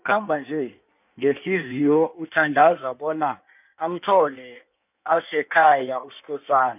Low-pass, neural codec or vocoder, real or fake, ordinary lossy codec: 3.6 kHz; codec, 16 kHz in and 24 kHz out, 1.1 kbps, FireRedTTS-2 codec; fake; none